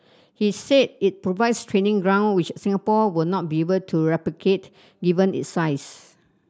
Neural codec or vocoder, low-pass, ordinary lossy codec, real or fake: none; none; none; real